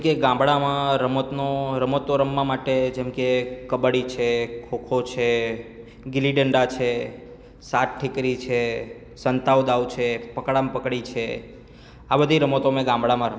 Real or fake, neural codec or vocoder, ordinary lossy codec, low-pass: real; none; none; none